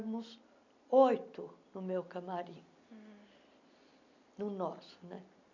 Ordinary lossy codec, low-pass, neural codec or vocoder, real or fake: none; 7.2 kHz; none; real